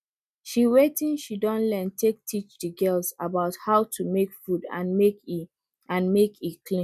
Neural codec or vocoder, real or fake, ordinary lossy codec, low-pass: none; real; none; 14.4 kHz